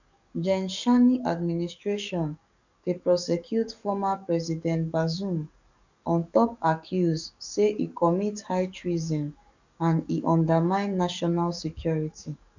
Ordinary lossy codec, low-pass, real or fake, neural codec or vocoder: none; 7.2 kHz; fake; codec, 44.1 kHz, 7.8 kbps, DAC